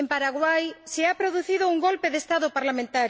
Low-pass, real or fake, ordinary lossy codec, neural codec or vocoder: none; real; none; none